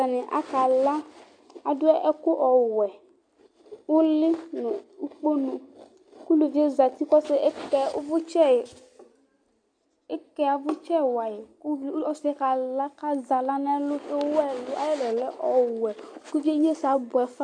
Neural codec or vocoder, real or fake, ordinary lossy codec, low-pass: none; real; AAC, 64 kbps; 9.9 kHz